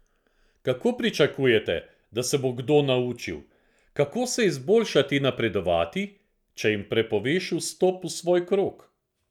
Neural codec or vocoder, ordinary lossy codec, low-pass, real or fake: none; none; 19.8 kHz; real